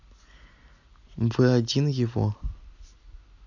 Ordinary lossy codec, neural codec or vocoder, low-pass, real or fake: none; none; 7.2 kHz; real